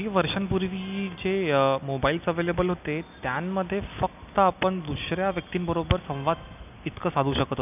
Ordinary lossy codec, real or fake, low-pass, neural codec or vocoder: none; real; 3.6 kHz; none